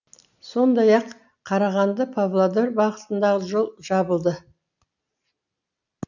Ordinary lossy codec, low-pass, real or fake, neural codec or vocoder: none; 7.2 kHz; real; none